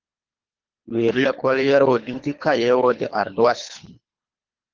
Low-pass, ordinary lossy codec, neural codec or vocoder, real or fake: 7.2 kHz; Opus, 32 kbps; codec, 24 kHz, 3 kbps, HILCodec; fake